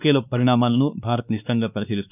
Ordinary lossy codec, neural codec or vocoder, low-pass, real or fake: none; codec, 16 kHz, 2 kbps, X-Codec, WavLM features, trained on Multilingual LibriSpeech; 3.6 kHz; fake